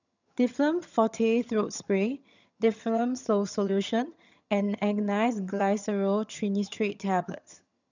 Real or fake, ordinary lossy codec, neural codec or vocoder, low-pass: fake; none; vocoder, 22.05 kHz, 80 mel bands, HiFi-GAN; 7.2 kHz